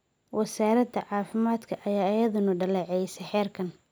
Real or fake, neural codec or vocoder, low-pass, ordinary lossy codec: real; none; none; none